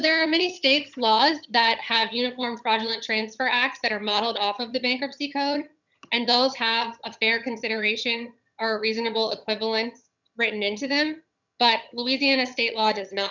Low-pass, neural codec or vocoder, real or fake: 7.2 kHz; vocoder, 22.05 kHz, 80 mel bands, HiFi-GAN; fake